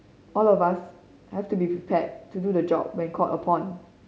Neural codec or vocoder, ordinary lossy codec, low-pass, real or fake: none; none; none; real